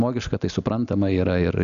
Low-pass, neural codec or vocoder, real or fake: 7.2 kHz; none; real